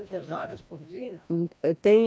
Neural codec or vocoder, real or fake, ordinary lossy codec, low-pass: codec, 16 kHz, 1 kbps, FreqCodec, larger model; fake; none; none